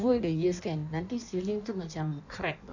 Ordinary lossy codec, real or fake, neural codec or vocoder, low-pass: none; fake; codec, 16 kHz in and 24 kHz out, 1.1 kbps, FireRedTTS-2 codec; 7.2 kHz